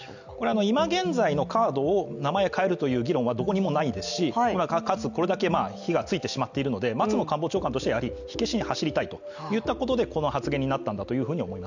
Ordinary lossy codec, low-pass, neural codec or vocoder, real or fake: none; 7.2 kHz; none; real